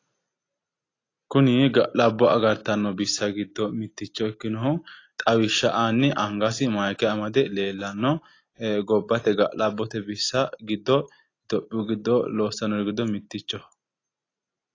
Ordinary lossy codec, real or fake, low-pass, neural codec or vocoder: AAC, 32 kbps; real; 7.2 kHz; none